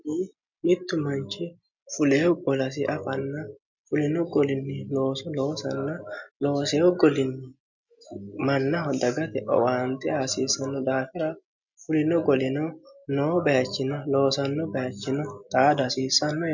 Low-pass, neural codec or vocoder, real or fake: 7.2 kHz; none; real